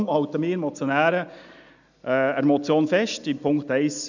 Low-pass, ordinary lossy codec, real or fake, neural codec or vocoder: 7.2 kHz; none; real; none